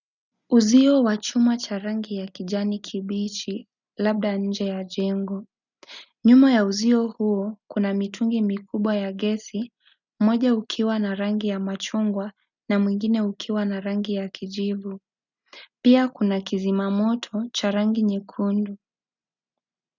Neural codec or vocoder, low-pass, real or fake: none; 7.2 kHz; real